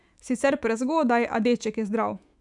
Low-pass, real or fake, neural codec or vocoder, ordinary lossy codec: 10.8 kHz; fake; autoencoder, 48 kHz, 128 numbers a frame, DAC-VAE, trained on Japanese speech; none